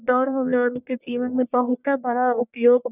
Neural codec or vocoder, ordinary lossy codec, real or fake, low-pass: codec, 44.1 kHz, 1.7 kbps, Pupu-Codec; none; fake; 3.6 kHz